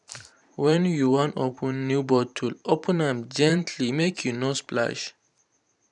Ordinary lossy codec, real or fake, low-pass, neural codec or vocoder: none; fake; 10.8 kHz; vocoder, 44.1 kHz, 128 mel bands every 512 samples, BigVGAN v2